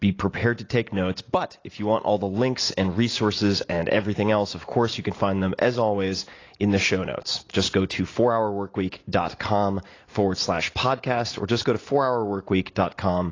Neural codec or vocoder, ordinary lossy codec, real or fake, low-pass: none; AAC, 32 kbps; real; 7.2 kHz